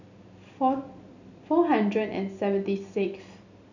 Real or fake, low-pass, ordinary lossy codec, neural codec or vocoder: real; 7.2 kHz; none; none